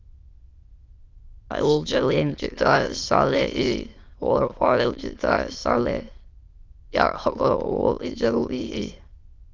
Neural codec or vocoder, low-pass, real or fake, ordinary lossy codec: autoencoder, 22.05 kHz, a latent of 192 numbers a frame, VITS, trained on many speakers; 7.2 kHz; fake; Opus, 32 kbps